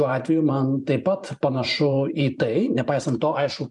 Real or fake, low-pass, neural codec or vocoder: fake; 10.8 kHz; vocoder, 44.1 kHz, 128 mel bands every 512 samples, BigVGAN v2